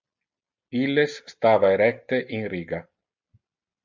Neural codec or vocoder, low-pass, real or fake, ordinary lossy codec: none; 7.2 kHz; real; MP3, 64 kbps